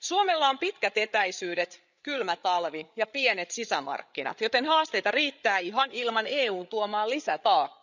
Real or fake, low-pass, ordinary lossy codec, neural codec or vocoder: fake; 7.2 kHz; none; codec, 16 kHz, 8 kbps, FreqCodec, larger model